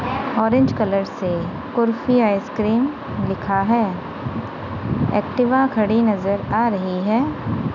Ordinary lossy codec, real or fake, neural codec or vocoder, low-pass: none; real; none; 7.2 kHz